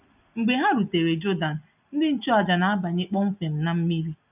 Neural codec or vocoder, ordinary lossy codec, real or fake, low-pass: none; none; real; 3.6 kHz